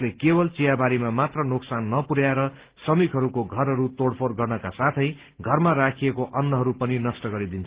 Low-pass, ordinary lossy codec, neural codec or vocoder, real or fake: 3.6 kHz; Opus, 16 kbps; none; real